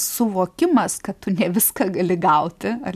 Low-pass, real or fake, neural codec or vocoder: 14.4 kHz; real; none